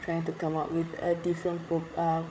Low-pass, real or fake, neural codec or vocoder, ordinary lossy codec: none; fake; codec, 16 kHz, 8 kbps, FreqCodec, larger model; none